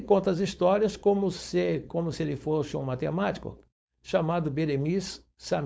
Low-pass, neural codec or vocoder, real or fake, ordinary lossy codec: none; codec, 16 kHz, 4.8 kbps, FACodec; fake; none